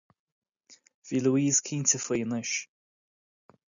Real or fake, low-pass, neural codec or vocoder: real; 7.2 kHz; none